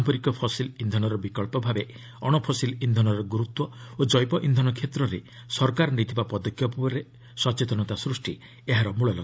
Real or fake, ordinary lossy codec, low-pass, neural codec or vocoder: real; none; none; none